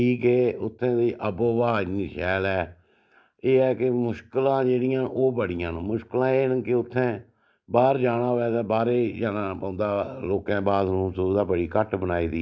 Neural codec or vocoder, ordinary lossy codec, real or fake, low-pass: none; none; real; none